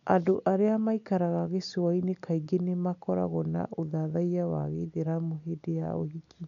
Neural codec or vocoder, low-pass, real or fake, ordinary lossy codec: none; 7.2 kHz; real; none